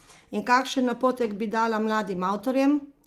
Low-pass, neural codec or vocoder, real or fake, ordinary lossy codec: 14.4 kHz; none; real; Opus, 24 kbps